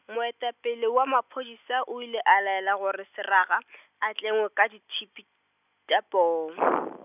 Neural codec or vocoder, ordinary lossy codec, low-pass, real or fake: none; none; 3.6 kHz; real